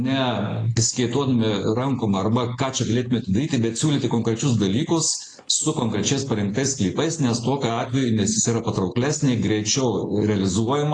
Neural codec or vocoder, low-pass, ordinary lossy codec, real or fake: none; 9.9 kHz; AAC, 32 kbps; real